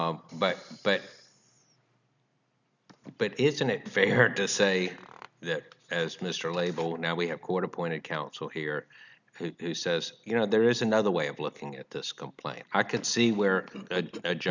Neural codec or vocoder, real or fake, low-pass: none; real; 7.2 kHz